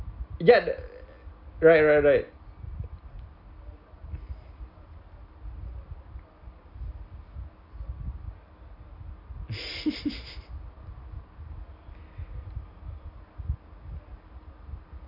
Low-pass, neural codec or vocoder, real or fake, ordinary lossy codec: 5.4 kHz; none; real; none